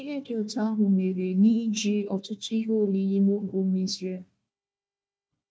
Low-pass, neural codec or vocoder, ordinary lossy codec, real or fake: none; codec, 16 kHz, 1 kbps, FunCodec, trained on Chinese and English, 50 frames a second; none; fake